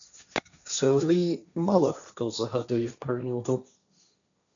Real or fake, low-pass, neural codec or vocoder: fake; 7.2 kHz; codec, 16 kHz, 1.1 kbps, Voila-Tokenizer